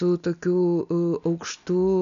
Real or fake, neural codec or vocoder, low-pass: real; none; 7.2 kHz